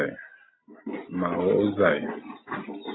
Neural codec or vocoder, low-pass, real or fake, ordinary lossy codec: none; 7.2 kHz; real; AAC, 16 kbps